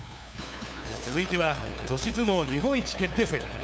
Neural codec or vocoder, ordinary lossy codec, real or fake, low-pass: codec, 16 kHz, 2 kbps, FunCodec, trained on LibriTTS, 25 frames a second; none; fake; none